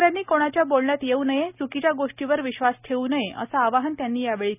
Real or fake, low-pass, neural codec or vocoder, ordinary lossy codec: real; 3.6 kHz; none; none